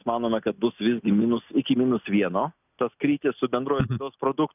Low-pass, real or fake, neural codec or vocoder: 3.6 kHz; real; none